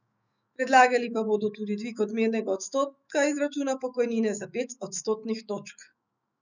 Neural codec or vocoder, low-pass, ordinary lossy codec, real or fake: autoencoder, 48 kHz, 128 numbers a frame, DAC-VAE, trained on Japanese speech; 7.2 kHz; none; fake